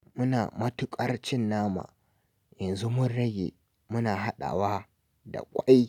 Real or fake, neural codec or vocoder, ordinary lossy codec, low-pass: real; none; none; 19.8 kHz